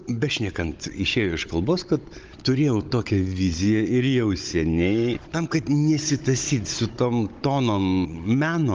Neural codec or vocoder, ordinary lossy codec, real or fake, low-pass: codec, 16 kHz, 16 kbps, FunCodec, trained on Chinese and English, 50 frames a second; Opus, 32 kbps; fake; 7.2 kHz